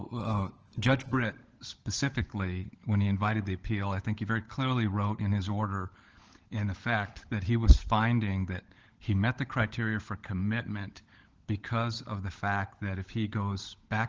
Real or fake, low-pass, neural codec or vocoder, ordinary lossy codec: fake; 7.2 kHz; codec, 16 kHz, 16 kbps, FunCodec, trained on LibriTTS, 50 frames a second; Opus, 16 kbps